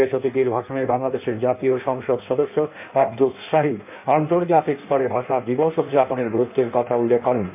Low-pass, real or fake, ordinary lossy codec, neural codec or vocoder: 3.6 kHz; fake; MP3, 32 kbps; codec, 16 kHz in and 24 kHz out, 1.1 kbps, FireRedTTS-2 codec